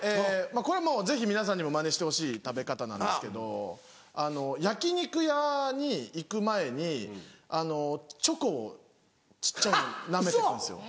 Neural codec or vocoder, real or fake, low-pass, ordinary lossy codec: none; real; none; none